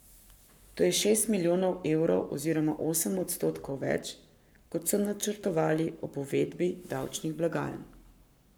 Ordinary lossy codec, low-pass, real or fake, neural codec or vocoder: none; none; fake; codec, 44.1 kHz, 7.8 kbps, Pupu-Codec